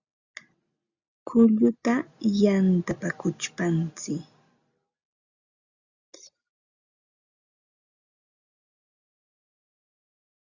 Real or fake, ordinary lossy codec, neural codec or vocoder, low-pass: real; Opus, 64 kbps; none; 7.2 kHz